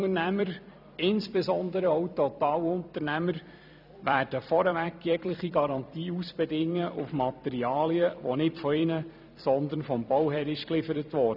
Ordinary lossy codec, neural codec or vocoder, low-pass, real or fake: none; vocoder, 44.1 kHz, 128 mel bands every 256 samples, BigVGAN v2; 5.4 kHz; fake